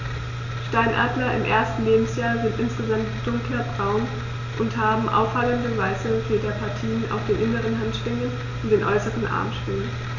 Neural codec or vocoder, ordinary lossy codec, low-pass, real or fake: none; none; 7.2 kHz; real